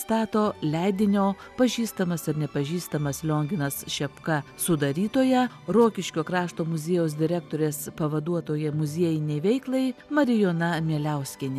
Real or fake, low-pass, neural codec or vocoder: real; 14.4 kHz; none